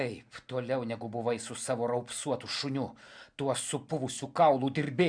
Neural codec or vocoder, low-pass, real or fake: none; 9.9 kHz; real